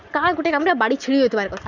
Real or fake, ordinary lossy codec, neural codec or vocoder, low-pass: real; none; none; 7.2 kHz